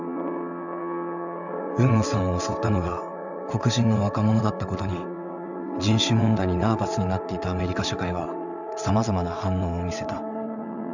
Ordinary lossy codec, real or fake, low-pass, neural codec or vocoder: none; fake; 7.2 kHz; vocoder, 22.05 kHz, 80 mel bands, WaveNeXt